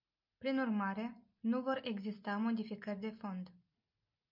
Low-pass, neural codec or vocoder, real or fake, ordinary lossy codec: 5.4 kHz; none; real; MP3, 48 kbps